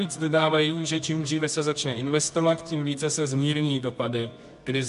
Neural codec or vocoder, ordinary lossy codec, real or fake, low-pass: codec, 24 kHz, 0.9 kbps, WavTokenizer, medium music audio release; MP3, 64 kbps; fake; 10.8 kHz